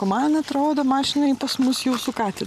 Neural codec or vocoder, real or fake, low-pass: none; real; 14.4 kHz